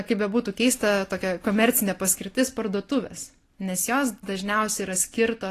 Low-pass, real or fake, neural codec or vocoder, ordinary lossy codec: 14.4 kHz; real; none; AAC, 48 kbps